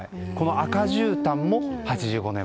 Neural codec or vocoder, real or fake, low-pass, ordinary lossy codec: none; real; none; none